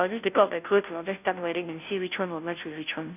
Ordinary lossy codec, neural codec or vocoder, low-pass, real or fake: none; codec, 16 kHz, 0.5 kbps, FunCodec, trained on Chinese and English, 25 frames a second; 3.6 kHz; fake